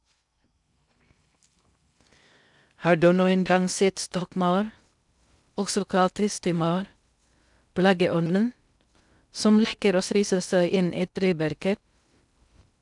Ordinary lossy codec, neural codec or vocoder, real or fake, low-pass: none; codec, 16 kHz in and 24 kHz out, 0.6 kbps, FocalCodec, streaming, 2048 codes; fake; 10.8 kHz